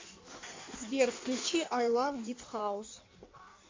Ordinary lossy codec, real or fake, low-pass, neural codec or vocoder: MP3, 64 kbps; fake; 7.2 kHz; codec, 16 kHz in and 24 kHz out, 1.1 kbps, FireRedTTS-2 codec